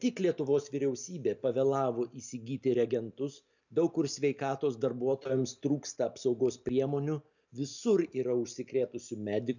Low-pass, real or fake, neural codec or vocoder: 7.2 kHz; real; none